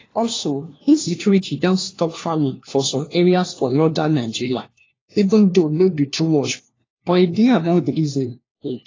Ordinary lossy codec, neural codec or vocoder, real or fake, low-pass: AAC, 32 kbps; codec, 16 kHz, 1 kbps, FunCodec, trained on LibriTTS, 50 frames a second; fake; 7.2 kHz